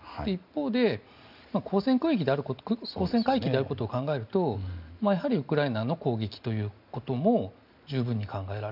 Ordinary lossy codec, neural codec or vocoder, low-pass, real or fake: none; none; 5.4 kHz; real